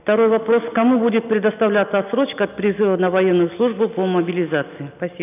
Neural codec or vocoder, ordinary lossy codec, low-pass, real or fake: none; none; 3.6 kHz; real